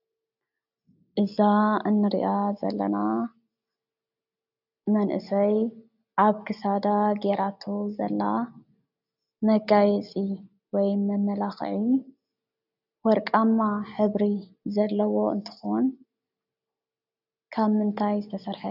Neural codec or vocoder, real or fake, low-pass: none; real; 5.4 kHz